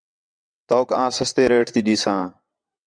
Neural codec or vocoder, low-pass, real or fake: vocoder, 22.05 kHz, 80 mel bands, WaveNeXt; 9.9 kHz; fake